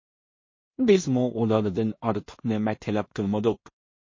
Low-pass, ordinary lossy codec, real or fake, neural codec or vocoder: 7.2 kHz; MP3, 32 kbps; fake; codec, 16 kHz in and 24 kHz out, 0.4 kbps, LongCat-Audio-Codec, two codebook decoder